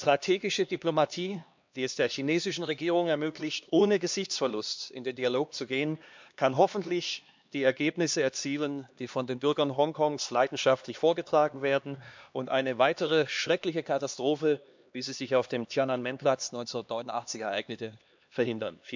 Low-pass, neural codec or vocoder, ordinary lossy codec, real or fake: 7.2 kHz; codec, 16 kHz, 2 kbps, X-Codec, HuBERT features, trained on LibriSpeech; MP3, 64 kbps; fake